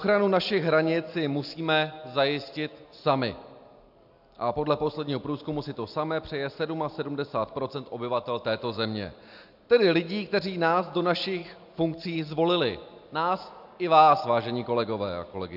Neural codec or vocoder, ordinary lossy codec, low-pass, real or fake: none; AAC, 48 kbps; 5.4 kHz; real